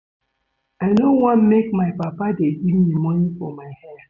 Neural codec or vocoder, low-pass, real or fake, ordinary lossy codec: none; 7.2 kHz; real; none